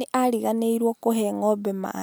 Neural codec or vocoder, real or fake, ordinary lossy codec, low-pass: none; real; none; none